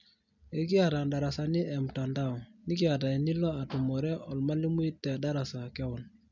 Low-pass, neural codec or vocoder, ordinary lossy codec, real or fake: 7.2 kHz; none; none; real